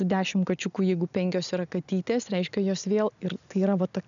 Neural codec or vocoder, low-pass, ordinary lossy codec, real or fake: none; 7.2 kHz; MP3, 96 kbps; real